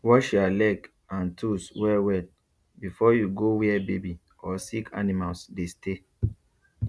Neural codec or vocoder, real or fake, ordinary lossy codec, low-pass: none; real; none; none